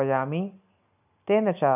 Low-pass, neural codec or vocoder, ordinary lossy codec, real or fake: 3.6 kHz; none; none; real